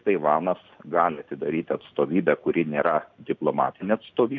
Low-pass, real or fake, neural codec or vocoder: 7.2 kHz; real; none